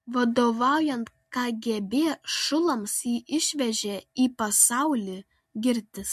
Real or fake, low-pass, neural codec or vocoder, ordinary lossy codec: real; 14.4 kHz; none; MP3, 64 kbps